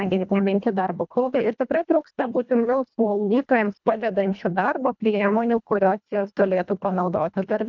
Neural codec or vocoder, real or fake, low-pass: codec, 24 kHz, 1.5 kbps, HILCodec; fake; 7.2 kHz